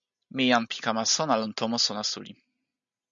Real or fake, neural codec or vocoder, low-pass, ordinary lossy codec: real; none; 7.2 kHz; MP3, 64 kbps